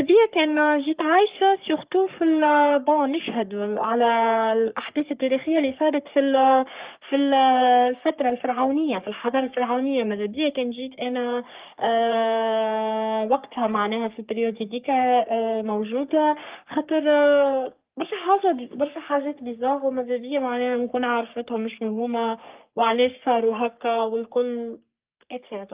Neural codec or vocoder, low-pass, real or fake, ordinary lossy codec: codec, 44.1 kHz, 3.4 kbps, Pupu-Codec; 3.6 kHz; fake; Opus, 32 kbps